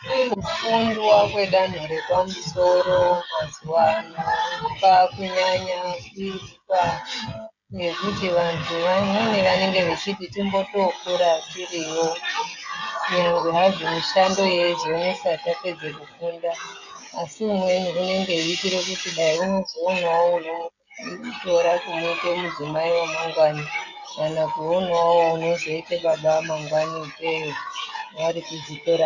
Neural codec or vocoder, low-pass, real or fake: codec, 16 kHz, 16 kbps, FreqCodec, smaller model; 7.2 kHz; fake